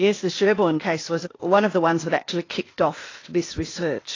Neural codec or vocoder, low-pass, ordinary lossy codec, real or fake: codec, 16 kHz, 0.8 kbps, ZipCodec; 7.2 kHz; AAC, 32 kbps; fake